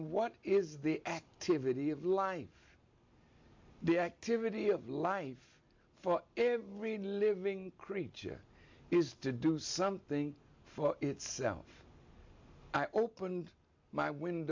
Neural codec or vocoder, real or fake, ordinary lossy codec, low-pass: none; real; MP3, 48 kbps; 7.2 kHz